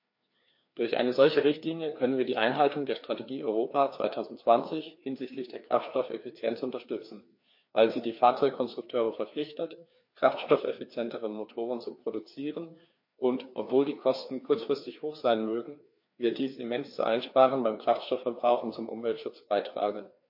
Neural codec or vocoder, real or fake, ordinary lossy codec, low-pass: codec, 16 kHz, 2 kbps, FreqCodec, larger model; fake; MP3, 32 kbps; 5.4 kHz